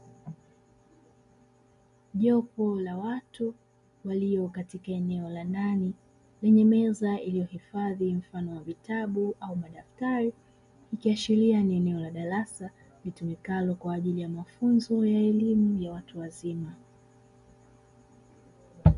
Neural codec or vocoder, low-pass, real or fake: none; 10.8 kHz; real